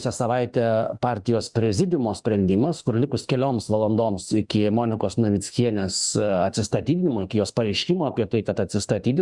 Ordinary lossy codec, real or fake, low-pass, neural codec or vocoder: Opus, 64 kbps; fake; 10.8 kHz; autoencoder, 48 kHz, 32 numbers a frame, DAC-VAE, trained on Japanese speech